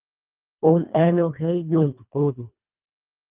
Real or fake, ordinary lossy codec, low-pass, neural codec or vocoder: fake; Opus, 24 kbps; 3.6 kHz; codec, 24 kHz, 1.5 kbps, HILCodec